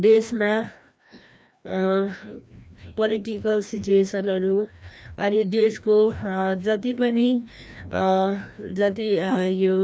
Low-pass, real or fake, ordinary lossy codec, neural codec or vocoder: none; fake; none; codec, 16 kHz, 1 kbps, FreqCodec, larger model